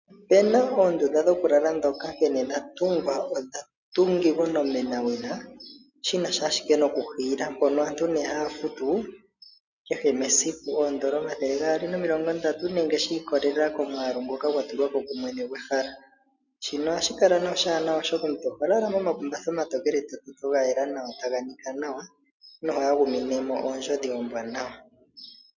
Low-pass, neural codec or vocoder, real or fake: 7.2 kHz; none; real